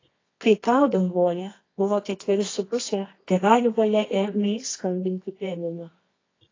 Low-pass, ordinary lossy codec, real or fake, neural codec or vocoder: 7.2 kHz; AAC, 32 kbps; fake; codec, 24 kHz, 0.9 kbps, WavTokenizer, medium music audio release